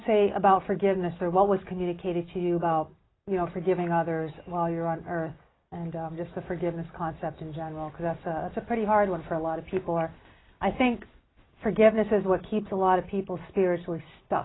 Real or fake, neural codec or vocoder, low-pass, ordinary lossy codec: real; none; 7.2 kHz; AAC, 16 kbps